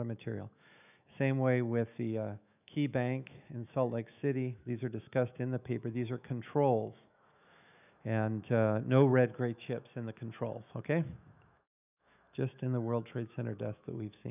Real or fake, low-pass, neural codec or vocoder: fake; 3.6 kHz; autoencoder, 48 kHz, 128 numbers a frame, DAC-VAE, trained on Japanese speech